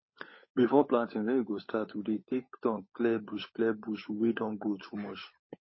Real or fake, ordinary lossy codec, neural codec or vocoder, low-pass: fake; MP3, 24 kbps; codec, 16 kHz, 16 kbps, FunCodec, trained on LibriTTS, 50 frames a second; 7.2 kHz